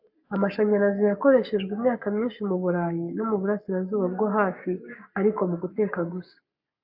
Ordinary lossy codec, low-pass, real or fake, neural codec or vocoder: AAC, 48 kbps; 5.4 kHz; fake; codec, 44.1 kHz, 7.8 kbps, Pupu-Codec